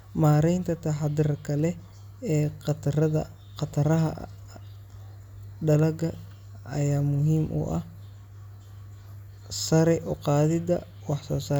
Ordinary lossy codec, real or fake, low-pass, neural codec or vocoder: none; real; 19.8 kHz; none